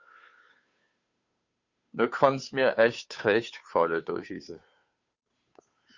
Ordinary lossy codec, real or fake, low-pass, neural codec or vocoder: Opus, 64 kbps; fake; 7.2 kHz; codec, 16 kHz, 2 kbps, FunCodec, trained on Chinese and English, 25 frames a second